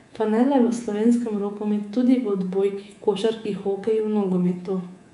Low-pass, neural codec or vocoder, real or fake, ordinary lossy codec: 10.8 kHz; codec, 24 kHz, 3.1 kbps, DualCodec; fake; none